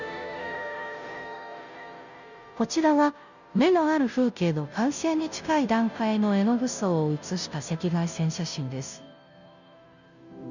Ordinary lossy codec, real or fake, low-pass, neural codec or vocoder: none; fake; 7.2 kHz; codec, 16 kHz, 0.5 kbps, FunCodec, trained on Chinese and English, 25 frames a second